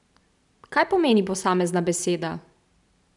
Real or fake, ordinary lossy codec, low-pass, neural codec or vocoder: fake; none; 10.8 kHz; vocoder, 44.1 kHz, 128 mel bands every 512 samples, BigVGAN v2